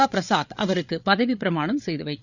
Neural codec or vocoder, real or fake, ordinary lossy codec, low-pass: codec, 16 kHz, 8 kbps, FreqCodec, larger model; fake; none; 7.2 kHz